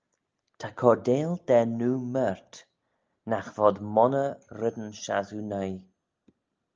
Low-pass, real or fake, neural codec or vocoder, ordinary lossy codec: 7.2 kHz; real; none; Opus, 24 kbps